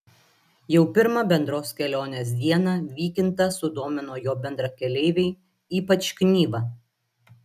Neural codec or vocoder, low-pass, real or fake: none; 14.4 kHz; real